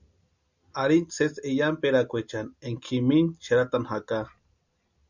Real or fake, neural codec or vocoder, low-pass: real; none; 7.2 kHz